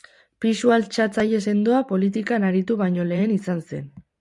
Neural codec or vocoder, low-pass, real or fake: vocoder, 44.1 kHz, 128 mel bands every 512 samples, BigVGAN v2; 10.8 kHz; fake